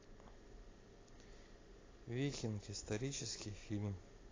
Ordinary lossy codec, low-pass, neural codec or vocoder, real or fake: AAC, 32 kbps; 7.2 kHz; none; real